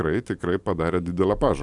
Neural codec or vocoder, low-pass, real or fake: vocoder, 44.1 kHz, 128 mel bands every 512 samples, BigVGAN v2; 10.8 kHz; fake